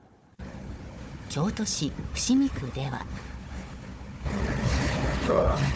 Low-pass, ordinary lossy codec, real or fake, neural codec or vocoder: none; none; fake; codec, 16 kHz, 4 kbps, FunCodec, trained on Chinese and English, 50 frames a second